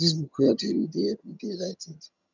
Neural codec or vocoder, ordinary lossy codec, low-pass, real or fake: vocoder, 22.05 kHz, 80 mel bands, HiFi-GAN; none; 7.2 kHz; fake